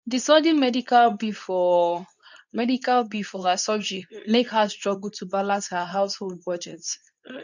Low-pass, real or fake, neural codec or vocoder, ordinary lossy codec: 7.2 kHz; fake; codec, 24 kHz, 0.9 kbps, WavTokenizer, medium speech release version 2; none